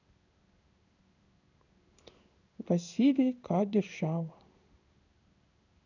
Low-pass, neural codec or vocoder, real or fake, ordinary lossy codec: 7.2 kHz; codec, 16 kHz in and 24 kHz out, 1 kbps, XY-Tokenizer; fake; none